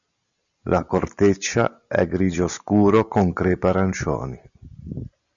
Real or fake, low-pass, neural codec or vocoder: real; 7.2 kHz; none